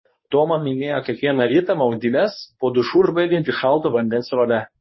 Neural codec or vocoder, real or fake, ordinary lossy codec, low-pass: codec, 24 kHz, 0.9 kbps, WavTokenizer, medium speech release version 1; fake; MP3, 24 kbps; 7.2 kHz